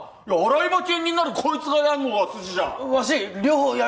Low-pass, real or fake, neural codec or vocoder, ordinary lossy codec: none; real; none; none